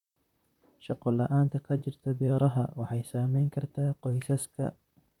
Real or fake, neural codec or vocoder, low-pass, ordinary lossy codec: fake; vocoder, 44.1 kHz, 128 mel bands, Pupu-Vocoder; 19.8 kHz; none